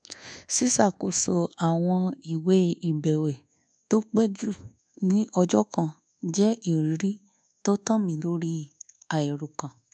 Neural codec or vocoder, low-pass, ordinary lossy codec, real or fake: codec, 24 kHz, 1.2 kbps, DualCodec; 9.9 kHz; none; fake